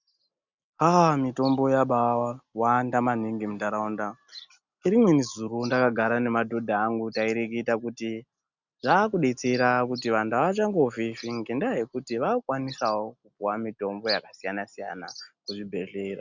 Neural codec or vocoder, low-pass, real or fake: none; 7.2 kHz; real